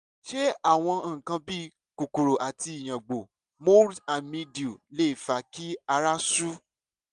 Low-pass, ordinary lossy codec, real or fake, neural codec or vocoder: 10.8 kHz; MP3, 96 kbps; real; none